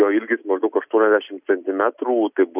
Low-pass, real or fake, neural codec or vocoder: 3.6 kHz; real; none